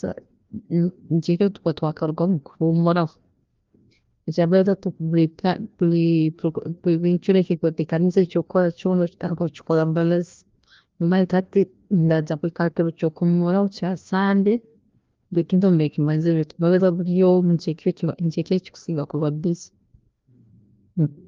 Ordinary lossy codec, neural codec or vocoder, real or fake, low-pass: Opus, 32 kbps; codec, 16 kHz, 1 kbps, FreqCodec, larger model; fake; 7.2 kHz